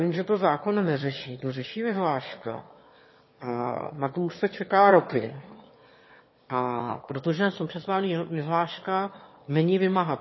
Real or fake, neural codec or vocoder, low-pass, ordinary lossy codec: fake; autoencoder, 22.05 kHz, a latent of 192 numbers a frame, VITS, trained on one speaker; 7.2 kHz; MP3, 24 kbps